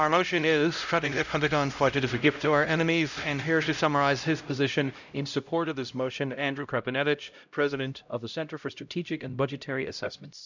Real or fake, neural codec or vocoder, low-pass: fake; codec, 16 kHz, 0.5 kbps, X-Codec, HuBERT features, trained on LibriSpeech; 7.2 kHz